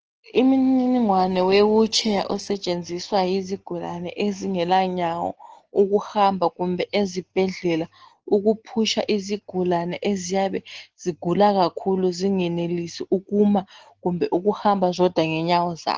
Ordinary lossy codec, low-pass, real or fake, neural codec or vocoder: Opus, 16 kbps; 7.2 kHz; real; none